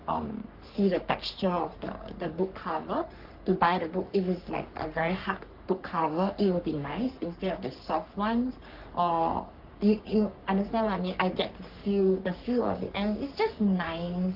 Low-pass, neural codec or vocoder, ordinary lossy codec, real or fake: 5.4 kHz; codec, 44.1 kHz, 3.4 kbps, Pupu-Codec; Opus, 24 kbps; fake